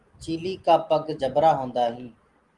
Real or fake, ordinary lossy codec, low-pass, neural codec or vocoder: real; Opus, 24 kbps; 10.8 kHz; none